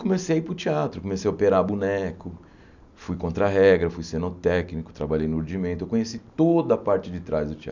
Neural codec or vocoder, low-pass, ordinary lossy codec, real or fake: none; 7.2 kHz; none; real